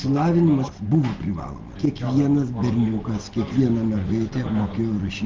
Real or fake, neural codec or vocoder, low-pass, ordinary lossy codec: real; none; 7.2 kHz; Opus, 16 kbps